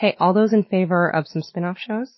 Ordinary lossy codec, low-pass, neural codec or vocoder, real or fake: MP3, 24 kbps; 7.2 kHz; autoencoder, 48 kHz, 128 numbers a frame, DAC-VAE, trained on Japanese speech; fake